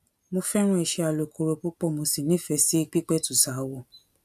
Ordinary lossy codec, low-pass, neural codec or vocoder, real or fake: none; 14.4 kHz; none; real